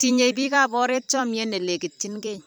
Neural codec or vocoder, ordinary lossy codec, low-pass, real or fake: vocoder, 44.1 kHz, 128 mel bands every 256 samples, BigVGAN v2; none; none; fake